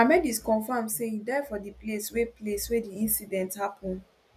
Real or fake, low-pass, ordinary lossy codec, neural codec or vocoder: real; 14.4 kHz; none; none